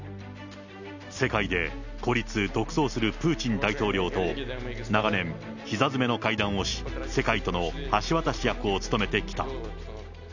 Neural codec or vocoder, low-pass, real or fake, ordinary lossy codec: none; 7.2 kHz; real; none